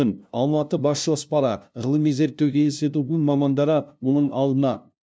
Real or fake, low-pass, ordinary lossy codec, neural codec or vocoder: fake; none; none; codec, 16 kHz, 0.5 kbps, FunCodec, trained on LibriTTS, 25 frames a second